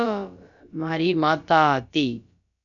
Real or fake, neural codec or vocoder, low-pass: fake; codec, 16 kHz, about 1 kbps, DyCAST, with the encoder's durations; 7.2 kHz